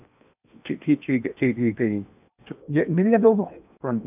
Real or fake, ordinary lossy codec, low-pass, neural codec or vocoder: fake; none; 3.6 kHz; codec, 16 kHz in and 24 kHz out, 0.8 kbps, FocalCodec, streaming, 65536 codes